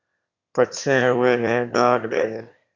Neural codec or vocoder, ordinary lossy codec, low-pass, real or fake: autoencoder, 22.05 kHz, a latent of 192 numbers a frame, VITS, trained on one speaker; Opus, 64 kbps; 7.2 kHz; fake